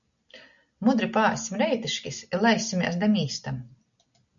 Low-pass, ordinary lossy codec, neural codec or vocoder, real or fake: 7.2 kHz; MP3, 96 kbps; none; real